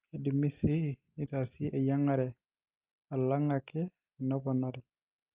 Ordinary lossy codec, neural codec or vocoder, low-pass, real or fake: Opus, 24 kbps; none; 3.6 kHz; real